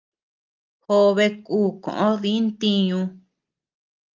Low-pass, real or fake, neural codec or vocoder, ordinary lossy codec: 7.2 kHz; real; none; Opus, 32 kbps